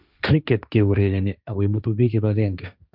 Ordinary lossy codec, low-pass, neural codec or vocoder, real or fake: none; 5.4 kHz; codec, 16 kHz, 1.1 kbps, Voila-Tokenizer; fake